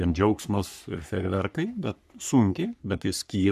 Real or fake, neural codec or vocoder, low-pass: fake; codec, 44.1 kHz, 3.4 kbps, Pupu-Codec; 14.4 kHz